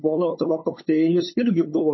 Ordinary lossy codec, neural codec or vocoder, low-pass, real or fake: MP3, 24 kbps; codec, 16 kHz, 8 kbps, FunCodec, trained on LibriTTS, 25 frames a second; 7.2 kHz; fake